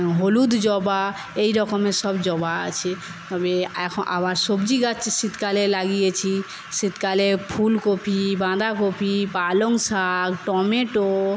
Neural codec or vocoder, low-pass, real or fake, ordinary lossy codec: none; none; real; none